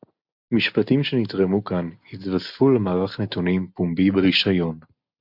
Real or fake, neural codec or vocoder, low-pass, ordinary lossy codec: real; none; 5.4 kHz; MP3, 48 kbps